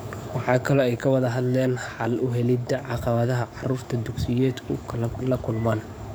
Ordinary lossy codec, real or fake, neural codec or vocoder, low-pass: none; fake; codec, 44.1 kHz, 7.8 kbps, DAC; none